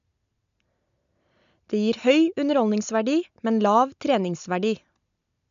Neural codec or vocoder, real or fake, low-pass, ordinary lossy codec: none; real; 7.2 kHz; none